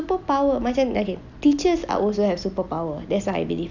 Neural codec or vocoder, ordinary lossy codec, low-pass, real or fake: none; none; 7.2 kHz; real